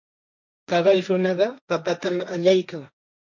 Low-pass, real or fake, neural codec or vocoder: 7.2 kHz; fake; codec, 16 kHz, 1.1 kbps, Voila-Tokenizer